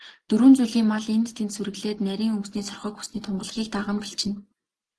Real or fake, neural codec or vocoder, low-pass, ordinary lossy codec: real; none; 10.8 kHz; Opus, 16 kbps